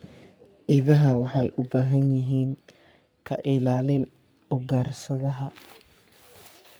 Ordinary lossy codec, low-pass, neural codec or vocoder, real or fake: none; none; codec, 44.1 kHz, 3.4 kbps, Pupu-Codec; fake